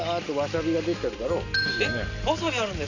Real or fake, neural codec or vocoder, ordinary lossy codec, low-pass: real; none; none; 7.2 kHz